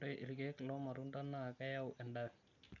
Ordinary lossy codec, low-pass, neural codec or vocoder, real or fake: none; 7.2 kHz; none; real